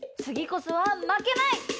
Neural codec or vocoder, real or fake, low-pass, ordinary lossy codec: none; real; none; none